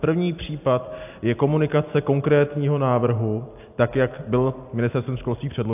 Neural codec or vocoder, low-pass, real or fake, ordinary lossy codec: none; 3.6 kHz; real; AAC, 32 kbps